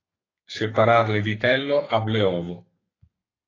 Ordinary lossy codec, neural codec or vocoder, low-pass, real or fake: AAC, 32 kbps; codec, 32 kHz, 1.9 kbps, SNAC; 7.2 kHz; fake